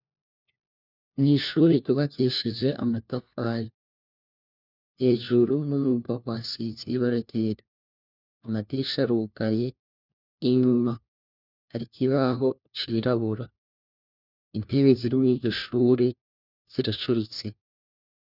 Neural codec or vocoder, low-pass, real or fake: codec, 16 kHz, 1 kbps, FunCodec, trained on LibriTTS, 50 frames a second; 5.4 kHz; fake